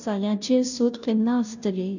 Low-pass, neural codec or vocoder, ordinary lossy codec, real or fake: 7.2 kHz; codec, 16 kHz, 0.5 kbps, FunCodec, trained on Chinese and English, 25 frames a second; none; fake